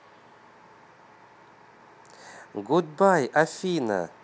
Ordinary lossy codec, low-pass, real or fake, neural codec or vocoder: none; none; real; none